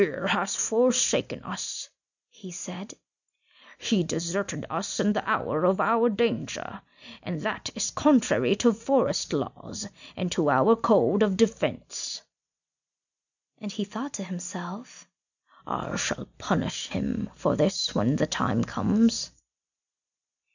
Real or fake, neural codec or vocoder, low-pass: real; none; 7.2 kHz